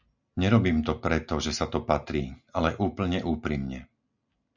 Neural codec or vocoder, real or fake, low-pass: none; real; 7.2 kHz